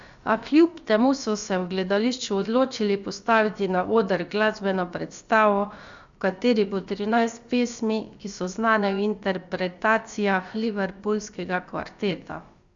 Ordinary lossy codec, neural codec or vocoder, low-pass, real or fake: Opus, 64 kbps; codec, 16 kHz, about 1 kbps, DyCAST, with the encoder's durations; 7.2 kHz; fake